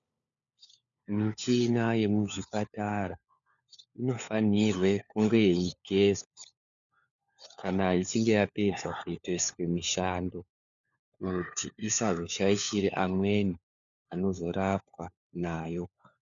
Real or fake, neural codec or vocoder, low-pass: fake; codec, 16 kHz, 4 kbps, FunCodec, trained on LibriTTS, 50 frames a second; 7.2 kHz